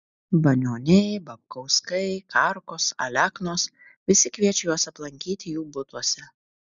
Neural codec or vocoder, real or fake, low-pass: none; real; 7.2 kHz